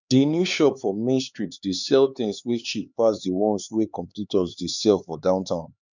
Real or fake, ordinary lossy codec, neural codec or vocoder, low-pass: fake; none; codec, 16 kHz, 4 kbps, X-Codec, HuBERT features, trained on LibriSpeech; 7.2 kHz